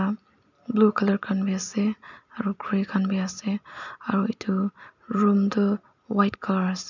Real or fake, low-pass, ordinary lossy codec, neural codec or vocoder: real; 7.2 kHz; none; none